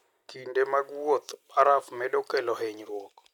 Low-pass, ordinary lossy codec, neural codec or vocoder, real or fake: 19.8 kHz; none; none; real